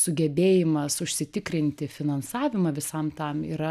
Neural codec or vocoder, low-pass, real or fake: none; 14.4 kHz; real